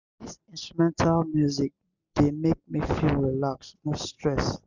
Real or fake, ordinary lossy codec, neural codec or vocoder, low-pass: real; Opus, 64 kbps; none; 7.2 kHz